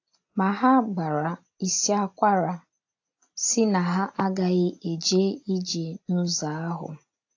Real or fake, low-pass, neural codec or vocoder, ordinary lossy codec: real; 7.2 kHz; none; AAC, 48 kbps